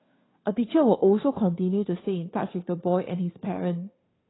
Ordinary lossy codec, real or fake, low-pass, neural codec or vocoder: AAC, 16 kbps; fake; 7.2 kHz; codec, 16 kHz, 2 kbps, FunCodec, trained on Chinese and English, 25 frames a second